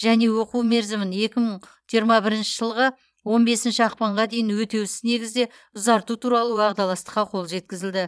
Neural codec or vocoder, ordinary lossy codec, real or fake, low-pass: vocoder, 22.05 kHz, 80 mel bands, Vocos; none; fake; none